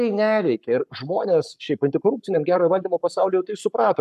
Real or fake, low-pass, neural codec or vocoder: fake; 14.4 kHz; codec, 44.1 kHz, 7.8 kbps, Pupu-Codec